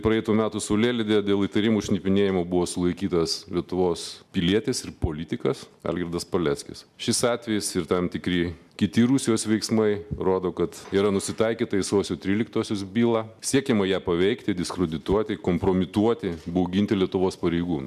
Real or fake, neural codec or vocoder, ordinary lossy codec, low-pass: real; none; MP3, 96 kbps; 14.4 kHz